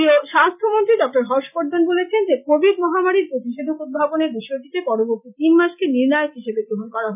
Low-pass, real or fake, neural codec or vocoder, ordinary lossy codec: 3.6 kHz; real; none; none